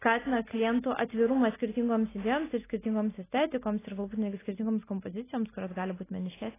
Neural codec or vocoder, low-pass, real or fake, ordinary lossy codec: none; 3.6 kHz; real; AAC, 16 kbps